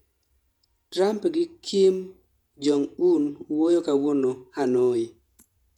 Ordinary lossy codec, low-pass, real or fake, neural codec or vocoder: none; 19.8 kHz; fake; vocoder, 48 kHz, 128 mel bands, Vocos